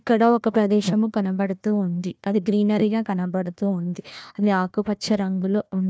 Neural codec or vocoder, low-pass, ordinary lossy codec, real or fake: codec, 16 kHz, 1 kbps, FunCodec, trained on Chinese and English, 50 frames a second; none; none; fake